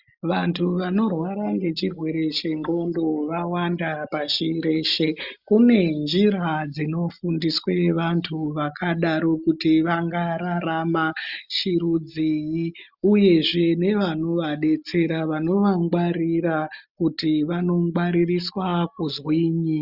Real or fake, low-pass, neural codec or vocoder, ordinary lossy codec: real; 5.4 kHz; none; Opus, 64 kbps